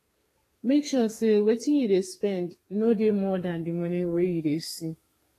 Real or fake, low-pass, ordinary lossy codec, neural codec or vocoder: fake; 14.4 kHz; AAC, 48 kbps; codec, 32 kHz, 1.9 kbps, SNAC